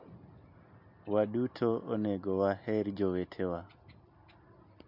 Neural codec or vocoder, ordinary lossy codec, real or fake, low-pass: none; MP3, 48 kbps; real; 5.4 kHz